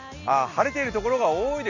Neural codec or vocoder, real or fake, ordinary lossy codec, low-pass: none; real; none; 7.2 kHz